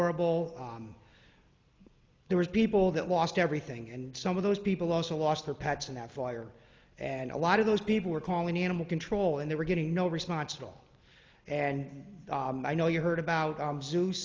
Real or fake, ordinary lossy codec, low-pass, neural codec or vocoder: real; Opus, 24 kbps; 7.2 kHz; none